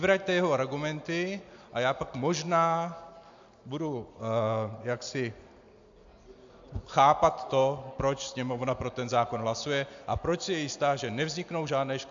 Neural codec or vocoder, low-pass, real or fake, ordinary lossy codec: none; 7.2 kHz; real; AAC, 64 kbps